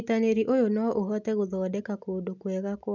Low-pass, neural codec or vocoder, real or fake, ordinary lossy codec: 7.2 kHz; none; real; none